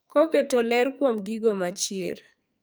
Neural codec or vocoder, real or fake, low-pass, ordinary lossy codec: codec, 44.1 kHz, 2.6 kbps, SNAC; fake; none; none